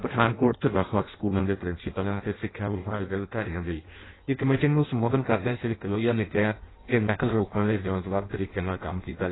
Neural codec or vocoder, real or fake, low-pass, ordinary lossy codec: codec, 16 kHz in and 24 kHz out, 0.6 kbps, FireRedTTS-2 codec; fake; 7.2 kHz; AAC, 16 kbps